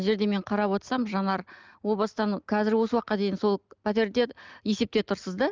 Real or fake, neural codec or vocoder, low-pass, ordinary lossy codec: real; none; 7.2 kHz; Opus, 24 kbps